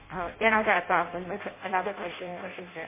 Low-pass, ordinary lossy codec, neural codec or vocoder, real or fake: 3.6 kHz; MP3, 16 kbps; codec, 16 kHz in and 24 kHz out, 0.6 kbps, FireRedTTS-2 codec; fake